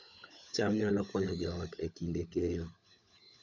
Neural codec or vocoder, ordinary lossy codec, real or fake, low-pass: codec, 16 kHz, 16 kbps, FunCodec, trained on LibriTTS, 50 frames a second; none; fake; 7.2 kHz